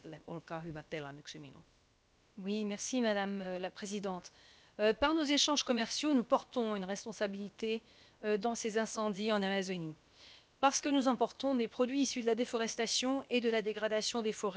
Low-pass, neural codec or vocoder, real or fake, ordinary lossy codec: none; codec, 16 kHz, about 1 kbps, DyCAST, with the encoder's durations; fake; none